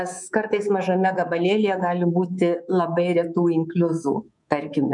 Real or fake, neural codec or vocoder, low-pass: fake; codec, 24 kHz, 3.1 kbps, DualCodec; 10.8 kHz